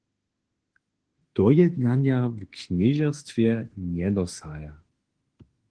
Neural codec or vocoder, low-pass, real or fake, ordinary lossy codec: autoencoder, 48 kHz, 32 numbers a frame, DAC-VAE, trained on Japanese speech; 9.9 kHz; fake; Opus, 16 kbps